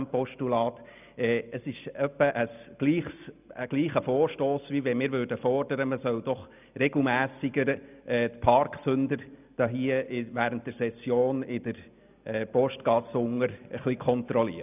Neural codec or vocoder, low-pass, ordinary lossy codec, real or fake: none; 3.6 kHz; none; real